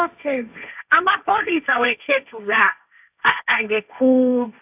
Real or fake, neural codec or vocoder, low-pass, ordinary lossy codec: fake; codec, 16 kHz, 1.1 kbps, Voila-Tokenizer; 3.6 kHz; none